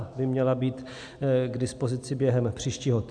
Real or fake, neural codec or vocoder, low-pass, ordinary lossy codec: real; none; 9.9 kHz; AAC, 64 kbps